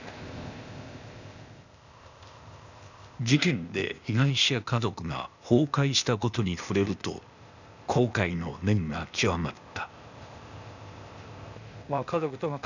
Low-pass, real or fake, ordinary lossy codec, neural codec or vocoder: 7.2 kHz; fake; none; codec, 16 kHz, 0.8 kbps, ZipCodec